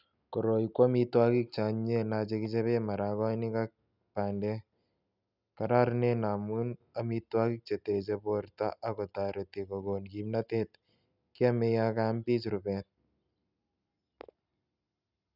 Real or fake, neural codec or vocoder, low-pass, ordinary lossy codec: real; none; 5.4 kHz; none